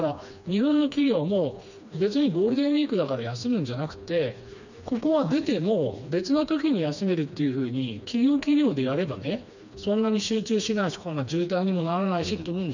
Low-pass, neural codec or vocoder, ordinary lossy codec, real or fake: 7.2 kHz; codec, 16 kHz, 2 kbps, FreqCodec, smaller model; none; fake